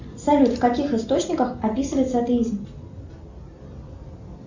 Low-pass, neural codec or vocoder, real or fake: 7.2 kHz; none; real